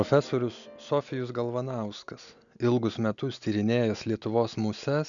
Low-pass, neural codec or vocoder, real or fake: 7.2 kHz; none; real